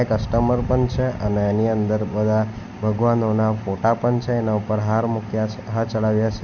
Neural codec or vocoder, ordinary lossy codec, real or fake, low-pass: none; none; real; 7.2 kHz